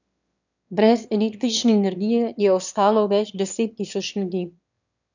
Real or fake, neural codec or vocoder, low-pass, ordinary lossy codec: fake; autoencoder, 22.05 kHz, a latent of 192 numbers a frame, VITS, trained on one speaker; 7.2 kHz; none